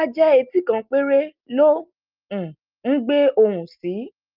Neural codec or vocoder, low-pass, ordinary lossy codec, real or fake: none; 5.4 kHz; Opus, 32 kbps; real